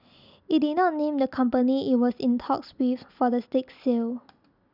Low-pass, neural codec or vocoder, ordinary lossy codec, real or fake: 5.4 kHz; none; none; real